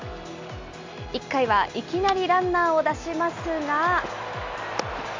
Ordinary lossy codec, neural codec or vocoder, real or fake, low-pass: MP3, 64 kbps; none; real; 7.2 kHz